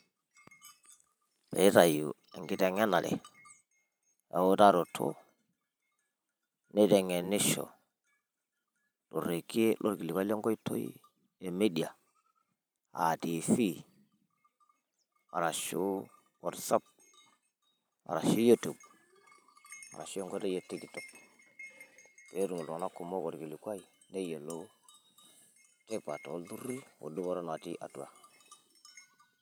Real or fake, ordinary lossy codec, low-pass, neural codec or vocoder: fake; none; none; vocoder, 44.1 kHz, 128 mel bands every 512 samples, BigVGAN v2